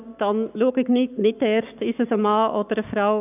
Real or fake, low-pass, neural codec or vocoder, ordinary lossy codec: fake; 3.6 kHz; codec, 44.1 kHz, 7.8 kbps, Pupu-Codec; none